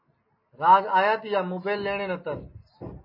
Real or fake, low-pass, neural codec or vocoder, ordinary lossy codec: real; 5.4 kHz; none; MP3, 24 kbps